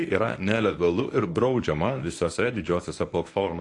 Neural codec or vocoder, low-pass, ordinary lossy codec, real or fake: codec, 24 kHz, 0.9 kbps, WavTokenizer, medium speech release version 1; 10.8 kHz; AAC, 48 kbps; fake